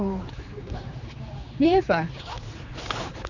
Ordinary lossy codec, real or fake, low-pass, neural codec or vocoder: none; fake; 7.2 kHz; codec, 16 kHz, 4 kbps, X-Codec, HuBERT features, trained on general audio